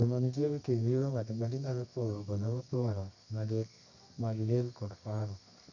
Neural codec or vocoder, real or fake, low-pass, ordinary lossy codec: codec, 24 kHz, 0.9 kbps, WavTokenizer, medium music audio release; fake; 7.2 kHz; none